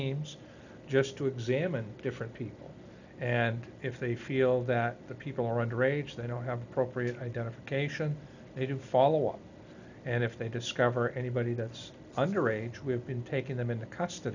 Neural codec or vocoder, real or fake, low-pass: none; real; 7.2 kHz